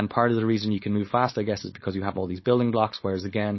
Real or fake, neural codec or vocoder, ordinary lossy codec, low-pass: fake; codec, 16 kHz, 4.8 kbps, FACodec; MP3, 24 kbps; 7.2 kHz